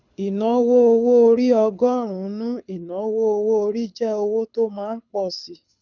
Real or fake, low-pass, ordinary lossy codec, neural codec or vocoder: fake; 7.2 kHz; none; codec, 24 kHz, 6 kbps, HILCodec